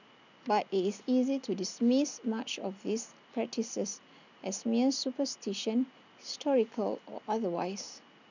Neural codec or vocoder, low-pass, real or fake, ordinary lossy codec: none; 7.2 kHz; real; none